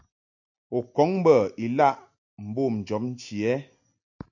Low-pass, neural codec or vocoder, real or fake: 7.2 kHz; none; real